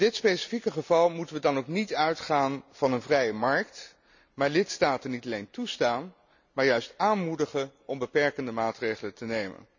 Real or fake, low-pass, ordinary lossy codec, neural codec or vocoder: real; 7.2 kHz; none; none